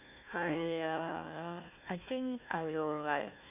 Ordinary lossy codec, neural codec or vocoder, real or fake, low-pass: none; codec, 16 kHz, 1 kbps, FunCodec, trained on Chinese and English, 50 frames a second; fake; 3.6 kHz